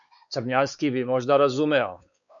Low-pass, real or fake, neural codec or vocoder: 7.2 kHz; fake; codec, 16 kHz, 4 kbps, X-Codec, WavLM features, trained on Multilingual LibriSpeech